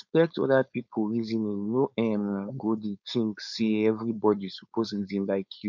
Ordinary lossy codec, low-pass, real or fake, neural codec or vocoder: none; 7.2 kHz; fake; codec, 16 kHz, 4.8 kbps, FACodec